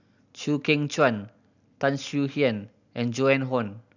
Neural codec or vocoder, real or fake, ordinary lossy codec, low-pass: none; real; none; 7.2 kHz